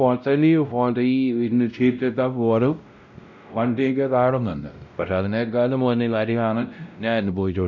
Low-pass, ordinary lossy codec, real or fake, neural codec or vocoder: 7.2 kHz; none; fake; codec, 16 kHz, 0.5 kbps, X-Codec, WavLM features, trained on Multilingual LibriSpeech